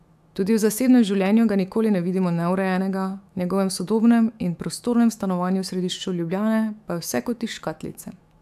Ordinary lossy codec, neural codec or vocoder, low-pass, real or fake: AAC, 96 kbps; autoencoder, 48 kHz, 128 numbers a frame, DAC-VAE, trained on Japanese speech; 14.4 kHz; fake